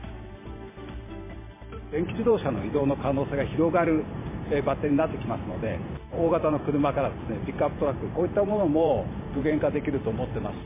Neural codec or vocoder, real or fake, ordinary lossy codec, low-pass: vocoder, 44.1 kHz, 128 mel bands every 512 samples, BigVGAN v2; fake; MP3, 16 kbps; 3.6 kHz